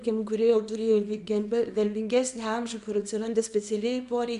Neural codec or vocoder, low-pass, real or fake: codec, 24 kHz, 0.9 kbps, WavTokenizer, small release; 10.8 kHz; fake